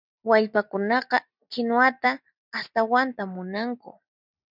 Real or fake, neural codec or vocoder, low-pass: real; none; 5.4 kHz